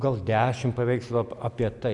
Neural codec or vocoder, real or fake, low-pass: none; real; 10.8 kHz